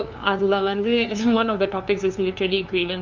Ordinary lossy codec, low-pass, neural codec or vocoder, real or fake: MP3, 64 kbps; 7.2 kHz; codec, 16 kHz, 2 kbps, FunCodec, trained on LibriTTS, 25 frames a second; fake